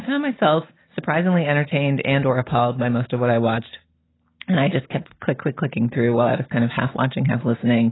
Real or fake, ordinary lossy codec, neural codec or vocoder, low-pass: real; AAC, 16 kbps; none; 7.2 kHz